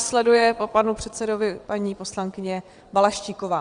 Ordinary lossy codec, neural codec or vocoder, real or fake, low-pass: Opus, 64 kbps; vocoder, 22.05 kHz, 80 mel bands, WaveNeXt; fake; 9.9 kHz